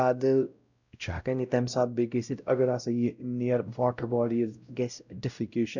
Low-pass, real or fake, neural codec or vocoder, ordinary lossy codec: 7.2 kHz; fake; codec, 16 kHz, 0.5 kbps, X-Codec, WavLM features, trained on Multilingual LibriSpeech; none